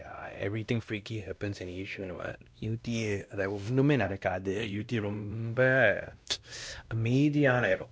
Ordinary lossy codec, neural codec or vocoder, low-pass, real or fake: none; codec, 16 kHz, 1 kbps, X-Codec, WavLM features, trained on Multilingual LibriSpeech; none; fake